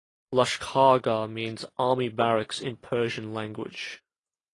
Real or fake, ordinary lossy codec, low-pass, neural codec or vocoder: real; AAC, 32 kbps; 10.8 kHz; none